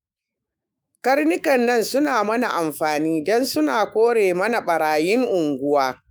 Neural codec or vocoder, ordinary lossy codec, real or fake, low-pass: autoencoder, 48 kHz, 128 numbers a frame, DAC-VAE, trained on Japanese speech; none; fake; none